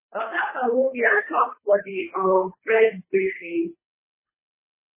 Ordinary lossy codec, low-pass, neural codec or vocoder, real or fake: MP3, 16 kbps; 3.6 kHz; codec, 24 kHz, 3 kbps, HILCodec; fake